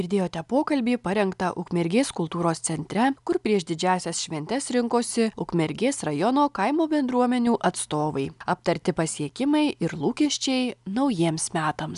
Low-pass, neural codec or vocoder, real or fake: 10.8 kHz; none; real